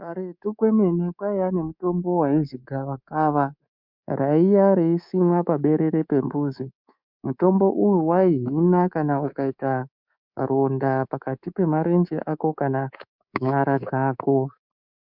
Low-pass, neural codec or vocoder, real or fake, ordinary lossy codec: 5.4 kHz; codec, 24 kHz, 3.1 kbps, DualCodec; fake; MP3, 48 kbps